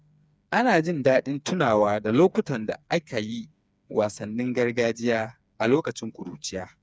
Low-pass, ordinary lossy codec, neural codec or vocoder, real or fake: none; none; codec, 16 kHz, 4 kbps, FreqCodec, smaller model; fake